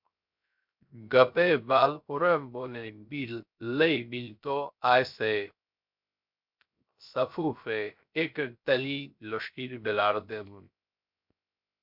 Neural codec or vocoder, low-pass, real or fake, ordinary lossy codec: codec, 16 kHz, 0.3 kbps, FocalCodec; 5.4 kHz; fake; MP3, 48 kbps